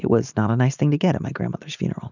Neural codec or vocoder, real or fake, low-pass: none; real; 7.2 kHz